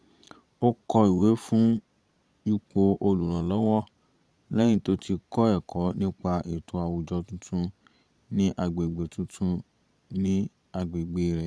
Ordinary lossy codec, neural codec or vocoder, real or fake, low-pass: none; vocoder, 22.05 kHz, 80 mel bands, Vocos; fake; none